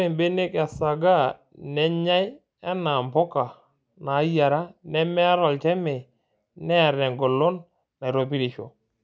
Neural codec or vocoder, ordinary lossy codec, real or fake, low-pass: none; none; real; none